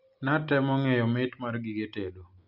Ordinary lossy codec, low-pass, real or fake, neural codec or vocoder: Opus, 64 kbps; 5.4 kHz; real; none